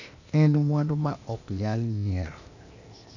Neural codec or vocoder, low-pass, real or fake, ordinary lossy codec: codec, 16 kHz, 0.8 kbps, ZipCodec; 7.2 kHz; fake; none